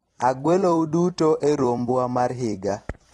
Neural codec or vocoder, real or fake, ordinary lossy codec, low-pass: vocoder, 44.1 kHz, 128 mel bands every 256 samples, BigVGAN v2; fake; AAC, 32 kbps; 19.8 kHz